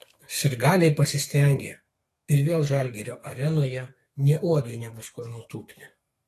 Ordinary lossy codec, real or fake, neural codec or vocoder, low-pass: AAC, 48 kbps; fake; codec, 32 kHz, 1.9 kbps, SNAC; 14.4 kHz